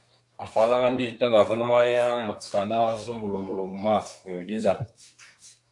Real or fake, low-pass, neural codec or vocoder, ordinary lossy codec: fake; 10.8 kHz; codec, 24 kHz, 1 kbps, SNAC; MP3, 96 kbps